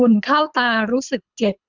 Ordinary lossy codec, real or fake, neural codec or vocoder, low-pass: none; fake; codec, 24 kHz, 3 kbps, HILCodec; 7.2 kHz